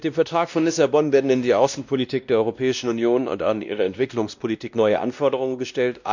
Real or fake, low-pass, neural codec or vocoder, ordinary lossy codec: fake; 7.2 kHz; codec, 16 kHz, 1 kbps, X-Codec, WavLM features, trained on Multilingual LibriSpeech; none